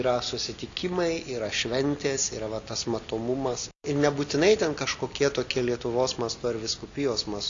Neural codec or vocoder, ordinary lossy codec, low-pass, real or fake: none; MP3, 64 kbps; 7.2 kHz; real